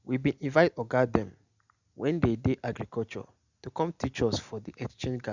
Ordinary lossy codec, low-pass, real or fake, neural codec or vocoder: Opus, 64 kbps; 7.2 kHz; real; none